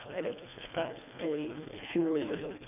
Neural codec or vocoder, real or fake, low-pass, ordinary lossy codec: codec, 24 kHz, 1.5 kbps, HILCodec; fake; 3.6 kHz; none